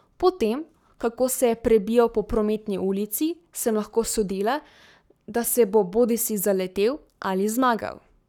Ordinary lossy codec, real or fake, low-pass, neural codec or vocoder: none; fake; 19.8 kHz; codec, 44.1 kHz, 7.8 kbps, Pupu-Codec